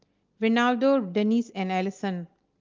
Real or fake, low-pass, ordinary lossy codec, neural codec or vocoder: real; 7.2 kHz; Opus, 32 kbps; none